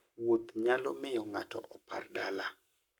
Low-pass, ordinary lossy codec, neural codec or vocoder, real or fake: 19.8 kHz; none; codec, 44.1 kHz, 7.8 kbps, Pupu-Codec; fake